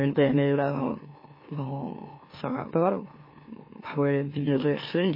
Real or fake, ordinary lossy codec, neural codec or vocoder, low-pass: fake; MP3, 24 kbps; autoencoder, 44.1 kHz, a latent of 192 numbers a frame, MeloTTS; 5.4 kHz